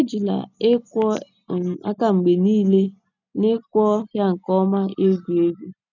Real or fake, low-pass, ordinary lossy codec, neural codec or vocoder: real; 7.2 kHz; none; none